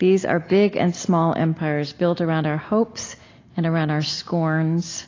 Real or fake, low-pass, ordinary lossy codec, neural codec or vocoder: real; 7.2 kHz; AAC, 32 kbps; none